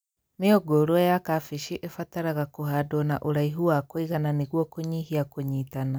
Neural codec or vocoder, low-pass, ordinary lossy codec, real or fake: none; none; none; real